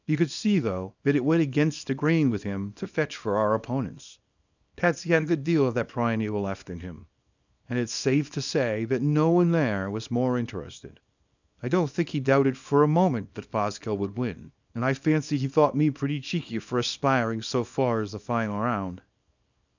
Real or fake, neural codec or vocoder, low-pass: fake; codec, 24 kHz, 0.9 kbps, WavTokenizer, small release; 7.2 kHz